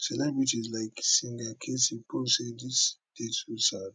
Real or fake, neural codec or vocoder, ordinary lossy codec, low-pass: real; none; none; none